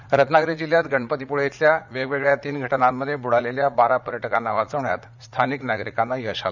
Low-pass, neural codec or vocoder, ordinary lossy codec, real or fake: 7.2 kHz; vocoder, 44.1 kHz, 80 mel bands, Vocos; none; fake